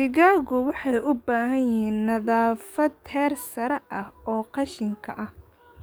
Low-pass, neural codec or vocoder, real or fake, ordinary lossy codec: none; codec, 44.1 kHz, 7.8 kbps, DAC; fake; none